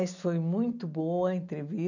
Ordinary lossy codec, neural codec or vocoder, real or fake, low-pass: none; none; real; 7.2 kHz